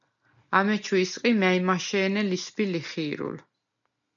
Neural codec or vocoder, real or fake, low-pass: none; real; 7.2 kHz